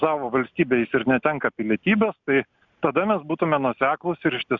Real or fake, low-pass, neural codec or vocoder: real; 7.2 kHz; none